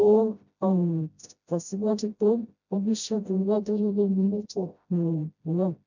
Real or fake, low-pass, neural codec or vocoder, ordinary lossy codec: fake; 7.2 kHz; codec, 16 kHz, 0.5 kbps, FreqCodec, smaller model; none